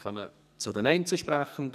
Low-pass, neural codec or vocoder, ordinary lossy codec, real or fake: 14.4 kHz; codec, 44.1 kHz, 2.6 kbps, SNAC; none; fake